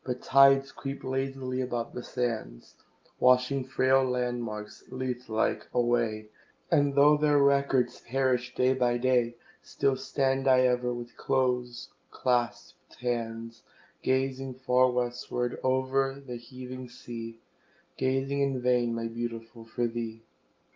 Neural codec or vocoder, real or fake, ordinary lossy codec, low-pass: none; real; Opus, 32 kbps; 7.2 kHz